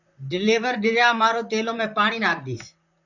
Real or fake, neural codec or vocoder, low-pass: fake; vocoder, 44.1 kHz, 128 mel bands, Pupu-Vocoder; 7.2 kHz